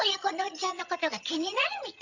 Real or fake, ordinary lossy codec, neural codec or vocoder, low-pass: fake; none; vocoder, 22.05 kHz, 80 mel bands, HiFi-GAN; 7.2 kHz